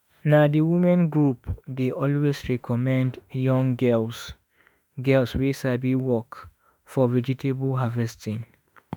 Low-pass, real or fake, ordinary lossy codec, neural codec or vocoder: none; fake; none; autoencoder, 48 kHz, 32 numbers a frame, DAC-VAE, trained on Japanese speech